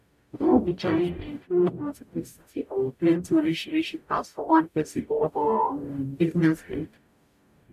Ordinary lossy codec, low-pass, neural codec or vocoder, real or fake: none; 14.4 kHz; codec, 44.1 kHz, 0.9 kbps, DAC; fake